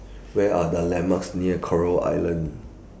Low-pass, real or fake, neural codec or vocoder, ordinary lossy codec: none; real; none; none